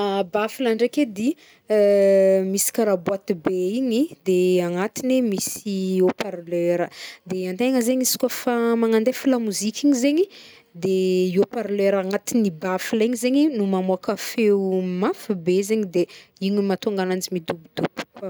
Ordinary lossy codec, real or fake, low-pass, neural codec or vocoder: none; real; none; none